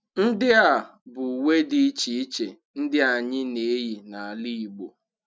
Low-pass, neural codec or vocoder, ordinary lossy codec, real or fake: none; none; none; real